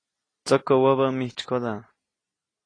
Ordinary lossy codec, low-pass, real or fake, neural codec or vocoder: AAC, 32 kbps; 9.9 kHz; real; none